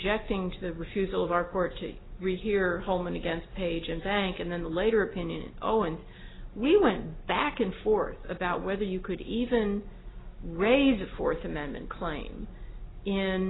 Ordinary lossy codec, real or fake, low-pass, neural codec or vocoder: AAC, 16 kbps; real; 7.2 kHz; none